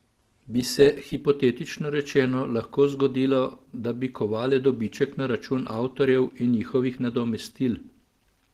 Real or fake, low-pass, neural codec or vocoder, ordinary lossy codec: real; 14.4 kHz; none; Opus, 16 kbps